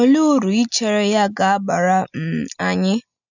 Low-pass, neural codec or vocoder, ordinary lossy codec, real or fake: 7.2 kHz; none; none; real